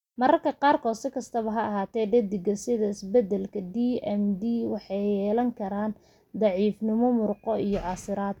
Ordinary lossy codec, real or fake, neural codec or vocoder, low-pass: none; real; none; 19.8 kHz